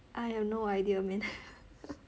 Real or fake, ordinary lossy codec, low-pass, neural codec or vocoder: real; none; none; none